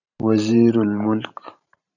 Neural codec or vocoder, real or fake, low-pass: vocoder, 44.1 kHz, 128 mel bands every 512 samples, BigVGAN v2; fake; 7.2 kHz